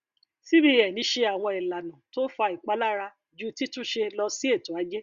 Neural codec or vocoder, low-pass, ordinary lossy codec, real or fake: none; 7.2 kHz; Opus, 64 kbps; real